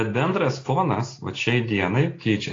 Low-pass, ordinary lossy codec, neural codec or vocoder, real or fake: 7.2 kHz; AAC, 32 kbps; none; real